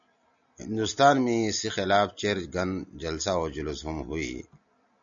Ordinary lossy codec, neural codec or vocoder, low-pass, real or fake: MP3, 64 kbps; none; 7.2 kHz; real